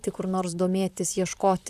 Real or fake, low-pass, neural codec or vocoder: fake; 14.4 kHz; vocoder, 44.1 kHz, 128 mel bands, Pupu-Vocoder